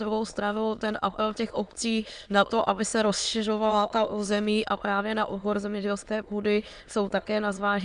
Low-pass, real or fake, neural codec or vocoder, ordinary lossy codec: 9.9 kHz; fake; autoencoder, 22.05 kHz, a latent of 192 numbers a frame, VITS, trained on many speakers; Opus, 64 kbps